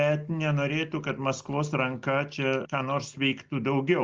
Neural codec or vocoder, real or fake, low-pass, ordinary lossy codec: none; real; 7.2 kHz; Opus, 32 kbps